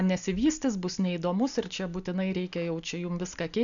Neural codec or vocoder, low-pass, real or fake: none; 7.2 kHz; real